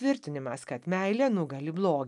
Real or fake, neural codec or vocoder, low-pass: real; none; 10.8 kHz